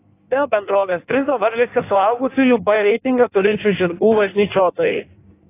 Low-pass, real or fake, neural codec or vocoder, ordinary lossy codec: 3.6 kHz; fake; codec, 16 kHz in and 24 kHz out, 1.1 kbps, FireRedTTS-2 codec; AAC, 24 kbps